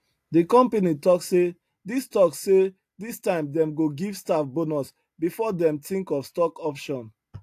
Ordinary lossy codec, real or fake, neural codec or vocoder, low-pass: AAC, 64 kbps; real; none; 14.4 kHz